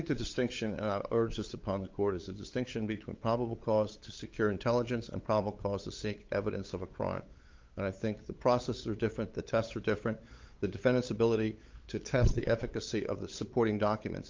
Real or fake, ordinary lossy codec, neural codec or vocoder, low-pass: fake; Opus, 32 kbps; codec, 16 kHz, 8 kbps, FunCodec, trained on LibriTTS, 25 frames a second; 7.2 kHz